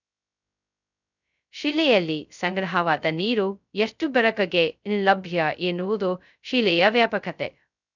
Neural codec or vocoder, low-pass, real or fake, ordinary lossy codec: codec, 16 kHz, 0.2 kbps, FocalCodec; 7.2 kHz; fake; none